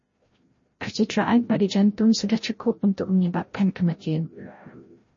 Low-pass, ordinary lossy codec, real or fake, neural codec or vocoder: 7.2 kHz; MP3, 32 kbps; fake; codec, 16 kHz, 0.5 kbps, FreqCodec, larger model